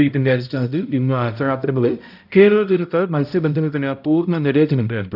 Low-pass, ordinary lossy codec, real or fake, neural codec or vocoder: 5.4 kHz; none; fake; codec, 16 kHz, 0.5 kbps, X-Codec, HuBERT features, trained on balanced general audio